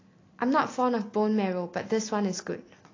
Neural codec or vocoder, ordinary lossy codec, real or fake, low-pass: none; AAC, 32 kbps; real; 7.2 kHz